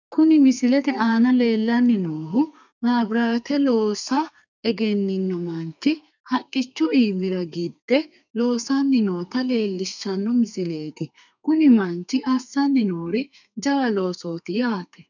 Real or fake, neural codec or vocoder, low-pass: fake; codec, 32 kHz, 1.9 kbps, SNAC; 7.2 kHz